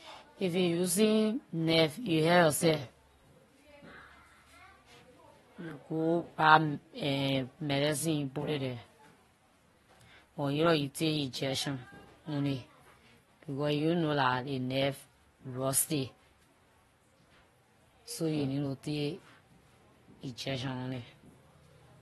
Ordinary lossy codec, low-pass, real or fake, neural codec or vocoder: AAC, 32 kbps; 19.8 kHz; fake; vocoder, 44.1 kHz, 128 mel bands every 512 samples, BigVGAN v2